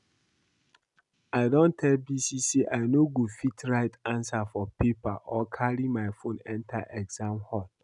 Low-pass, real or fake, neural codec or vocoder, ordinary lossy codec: 10.8 kHz; real; none; none